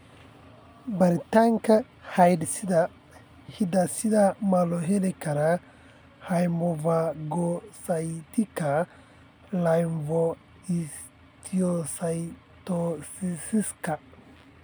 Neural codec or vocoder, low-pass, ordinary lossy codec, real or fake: none; none; none; real